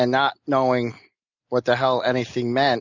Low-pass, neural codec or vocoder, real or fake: 7.2 kHz; none; real